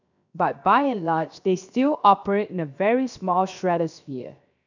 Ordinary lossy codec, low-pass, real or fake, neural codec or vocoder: none; 7.2 kHz; fake; codec, 16 kHz, 0.7 kbps, FocalCodec